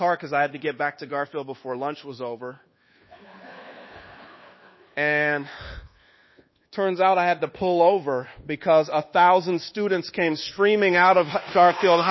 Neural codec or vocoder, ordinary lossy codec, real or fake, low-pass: codec, 24 kHz, 1.2 kbps, DualCodec; MP3, 24 kbps; fake; 7.2 kHz